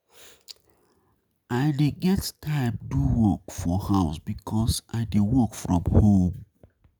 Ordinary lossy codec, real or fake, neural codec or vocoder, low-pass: none; real; none; none